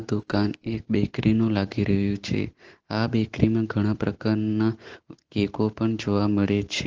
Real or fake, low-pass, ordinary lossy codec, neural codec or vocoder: real; 7.2 kHz; Opus, 16 kbps; none